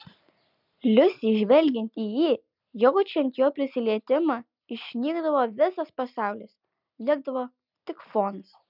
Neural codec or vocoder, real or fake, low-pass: none; real; 5.4 kHz